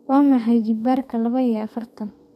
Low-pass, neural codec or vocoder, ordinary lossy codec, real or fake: 14.4 kHz; codec, 32 kHz, 1.9 kbps, SNAC; none; fake